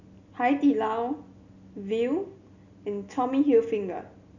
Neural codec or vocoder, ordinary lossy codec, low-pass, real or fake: none; none; 7.2 kHz; real